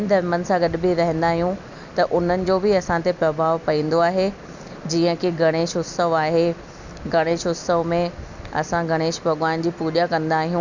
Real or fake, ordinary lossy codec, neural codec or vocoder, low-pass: real; none; none; 7.2 kHz